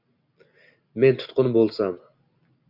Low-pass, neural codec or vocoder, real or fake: 5.4 kHz; none; real